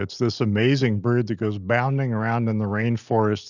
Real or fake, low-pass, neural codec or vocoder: real; 7.2 kHz; none